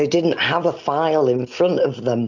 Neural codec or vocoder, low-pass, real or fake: none; 7.2 kHz; real